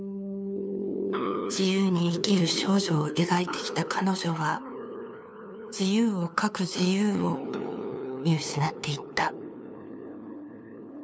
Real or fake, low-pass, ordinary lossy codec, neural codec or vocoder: fake; none; none; codec, 16 kHz, 2 kbps, FunCodec, trained on LibriTTS, 25 frames a second